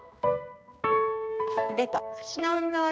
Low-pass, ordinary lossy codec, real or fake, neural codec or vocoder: none; none; fake; codec, 16 kHz, 2 kbps, X-Codec, HuBERT features, trained on balanced general audio